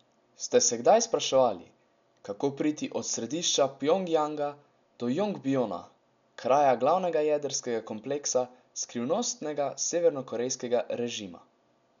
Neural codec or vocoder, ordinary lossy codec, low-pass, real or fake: none; none; 7.2 kHz; real